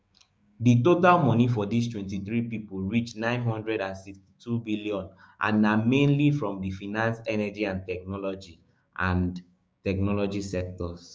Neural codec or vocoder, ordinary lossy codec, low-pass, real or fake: codec, 16 kHz, 6 kbps, DAC; none; none; fake